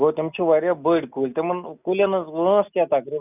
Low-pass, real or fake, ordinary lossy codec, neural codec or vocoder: 3.6 kHz; real; none; none